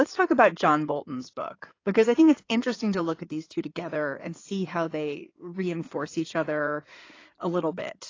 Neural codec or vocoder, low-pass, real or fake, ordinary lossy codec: codec, 16 kHz in and 24 kHz out, 2.2 kbps, FireRedTTS-2 codec; 7.2 kHz; fake; AAC, 32 kbps